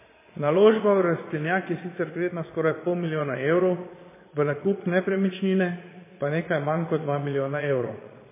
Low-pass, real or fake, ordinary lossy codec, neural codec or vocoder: 3.6 kHz; fake; MP3, 16 kbps; vocoder, 22.05 kHz, 80 mel bands, Vocos